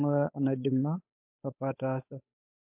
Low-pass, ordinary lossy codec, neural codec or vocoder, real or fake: 3.6 kHz; MP3, 32 kbps; codec, 16 kHz, 8 kbps, FunCodec, trained on Chinese and English, 25 frames a second; fake